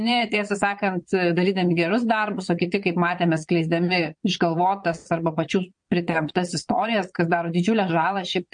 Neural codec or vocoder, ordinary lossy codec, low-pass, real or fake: vocoder, 22.05 kHz, 80 mel bands, Vocos; MP3, 48 kbps; 9.9 kHz; fake